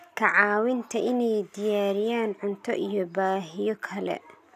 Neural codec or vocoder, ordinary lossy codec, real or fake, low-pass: none; none; real; 14.4 kHz